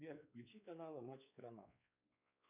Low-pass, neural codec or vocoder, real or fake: 3.6 kHz; codec, 16 kHz, 4 kbps, X-Codec, WavLM features, trained on Multilingual LibriSpeech; fake